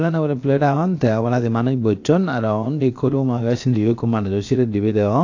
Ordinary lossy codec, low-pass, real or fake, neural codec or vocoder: AAC, 48 kbps; 7.2 kHz; fake; codec, 16 kHz, 0.7 kbps, FocalCodec